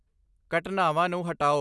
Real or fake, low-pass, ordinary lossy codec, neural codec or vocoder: real; none; none; none